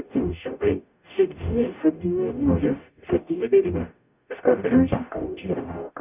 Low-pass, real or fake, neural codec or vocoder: 3.6 kHz; fake; codec, 44.1 kHz, 0.9 kbps, DAC